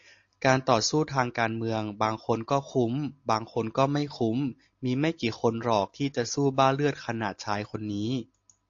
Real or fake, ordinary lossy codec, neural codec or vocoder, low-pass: real; AAC, 48 kbps; none; 7.2 kHz